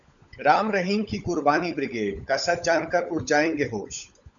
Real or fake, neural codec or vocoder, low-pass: fake; codec, 16 kHz, 16 kbps, FunCodec, trained on LibriTTS, 50 frames a second; 7.2 kHz